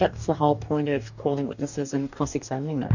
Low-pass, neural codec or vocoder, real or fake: 7.2 kHz; codec, 44.1 kHz, 2.6 kbps, DAC; fake